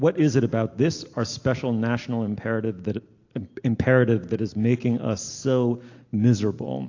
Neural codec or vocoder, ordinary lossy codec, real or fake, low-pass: none; AAC, 48 kbps; real; 7.2 kHz